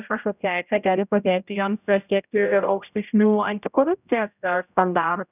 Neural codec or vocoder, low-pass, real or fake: codec, 16 kHz, 0.5 kbps, X-Codec, HuBERT features, trained on general audio; 3.6 kHz; fake